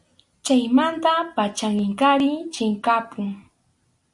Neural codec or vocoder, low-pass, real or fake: none; 10.8 kHz; real